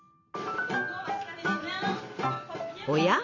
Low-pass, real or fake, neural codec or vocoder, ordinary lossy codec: 7.2 kHz; fake; vocoder, 44.1 kHz, 128 mel bands every 256 samples, BigVGAN v2; none